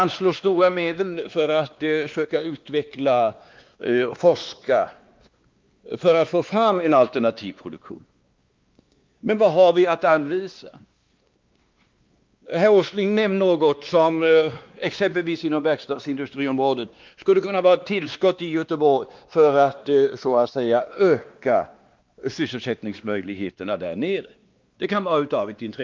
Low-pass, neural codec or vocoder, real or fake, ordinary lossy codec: 7.2 kHz; codec, 16 kHz, 2 kbps, X-Codec, WavLM features, trained on Multilingual LibriSpeech; fake; Opus, 32 kbps